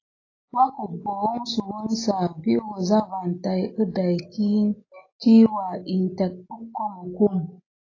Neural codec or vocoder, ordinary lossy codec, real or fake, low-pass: none; AAC, 32 kbps; real; 7.2 kHz